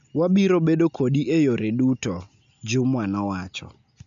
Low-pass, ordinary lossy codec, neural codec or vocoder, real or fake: 7.2 kHz; none; none; real